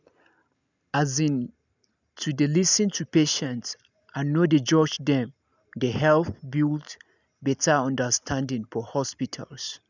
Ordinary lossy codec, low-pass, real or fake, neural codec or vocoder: none; 7.2 kHz; real; none